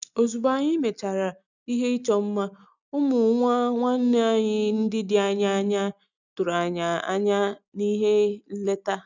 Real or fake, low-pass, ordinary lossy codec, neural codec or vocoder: real; 7.2 kHz; none; none